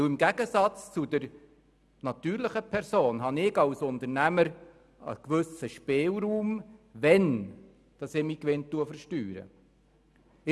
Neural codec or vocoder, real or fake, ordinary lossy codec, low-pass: none; real; none; none